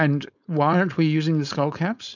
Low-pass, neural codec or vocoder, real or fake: 7.2 kHz; codec, 16 kHz, 4.8 kbps, FACodec; fake